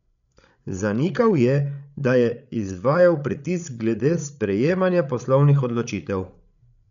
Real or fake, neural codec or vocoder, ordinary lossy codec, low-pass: fake; codec, 16 kHz, 8 kbps, FreqCodec, larger model; none; 7.2 kHz